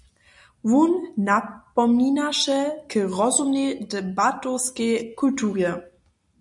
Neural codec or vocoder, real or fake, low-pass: none; real; 10.8 kHz